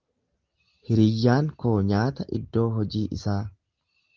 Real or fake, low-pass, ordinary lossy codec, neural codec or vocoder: real; 7.2 kHz; Opus, 32 kbps; none